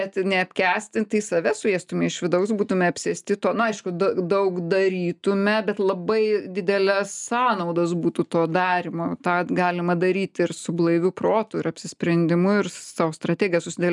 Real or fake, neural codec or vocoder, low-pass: real; none; 10.8 kHz